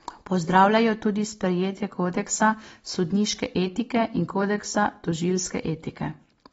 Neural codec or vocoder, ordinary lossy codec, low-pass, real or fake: none; AAC, 24 kbps; 10.8 kHz; real